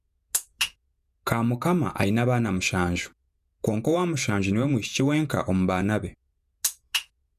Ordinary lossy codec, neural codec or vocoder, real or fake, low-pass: none; vocoder, 48 kHz, 128 mel bands, Vocos; fake; 14.4 kHz